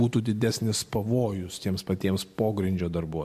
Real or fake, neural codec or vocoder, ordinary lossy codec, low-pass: real; none; MP3, 64 kbps; 14.4 kHz